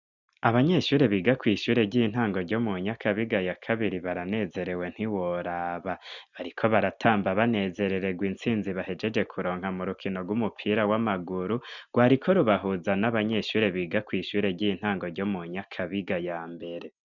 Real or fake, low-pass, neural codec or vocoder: real; 7.2 kHz; none